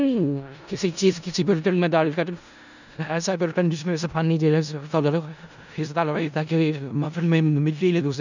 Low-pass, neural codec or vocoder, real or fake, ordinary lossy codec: 7.2 kHz; codec, 16 kHz in and 24 kHz out, 0.4 kbps, LongCat-Audio-Codec, four codebook decoder; fake; none